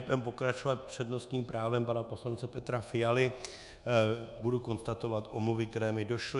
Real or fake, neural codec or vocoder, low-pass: fake; codec, 24 kHz, 1.2 kbps, DualCodec; 10.8 kHz